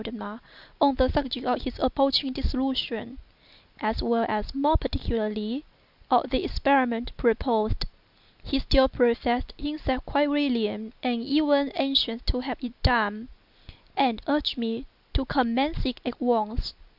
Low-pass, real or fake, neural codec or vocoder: 5.4 kHz; real; none